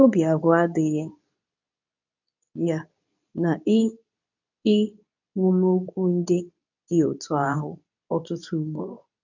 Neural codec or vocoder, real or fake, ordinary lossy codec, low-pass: codec, 24 kHz, 0.9 kbps, WavTokenizer, medium speech release version 2; fake; none; 7.2 kHz